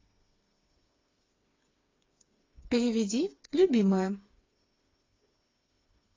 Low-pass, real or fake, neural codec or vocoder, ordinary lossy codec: 7.2 kHz; fake; codec, 16 kHz, 4 kbps, FreqCodec, smaller model; AAC, 32 kbps